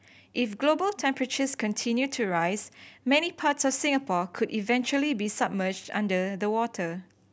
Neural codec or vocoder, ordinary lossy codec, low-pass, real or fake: none; none; none; real